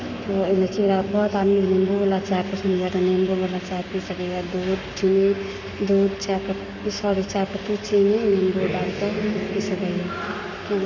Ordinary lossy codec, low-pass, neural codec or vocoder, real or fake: none; 7.2 kHz; codec, 44.1 kHz, 7.8 kbps, Pupu-Codec; fake